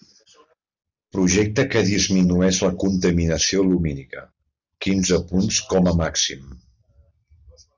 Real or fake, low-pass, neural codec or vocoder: real; 7.2 kHz; none